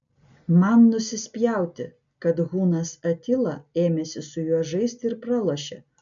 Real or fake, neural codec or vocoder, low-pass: real; none; 7.2 kHz